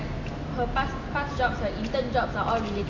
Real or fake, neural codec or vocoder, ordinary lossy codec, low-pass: real; none; AAC, 48 kbps; 7.2 kHz